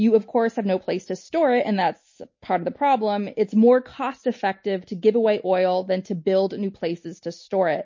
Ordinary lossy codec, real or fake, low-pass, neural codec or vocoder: MP3, 32 kbps; real; 7.2 kHz; none